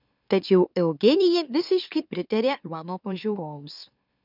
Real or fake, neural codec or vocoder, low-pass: fake; autoencoder, 44.1 kHz, a latent of 192 numbers a frame, MeloTTS; 5.4 kHz